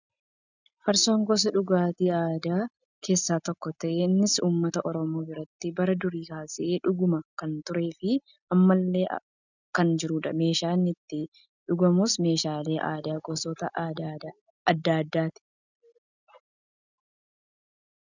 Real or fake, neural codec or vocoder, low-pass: real; none; 7.2 kHz